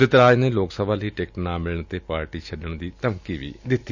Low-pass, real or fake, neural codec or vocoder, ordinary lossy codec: 7.2 kHz; real; none; none